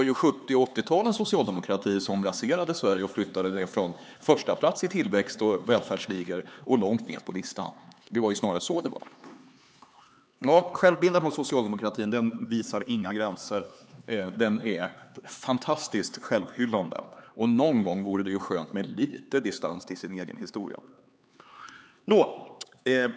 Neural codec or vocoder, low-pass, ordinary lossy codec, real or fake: codec, 16 kHz, 4 kbps, X-Codec, HuBERT features, trained on LibriSpeech; none; none; fake